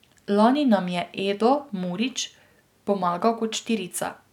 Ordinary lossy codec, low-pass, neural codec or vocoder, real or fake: none; 19.8 kHz; none; real